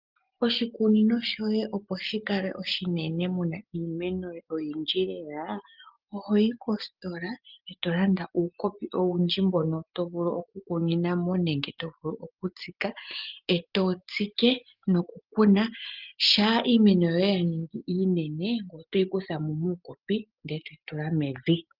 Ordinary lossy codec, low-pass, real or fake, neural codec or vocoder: Opus, 32 kbps; 5.4 kHz; real; none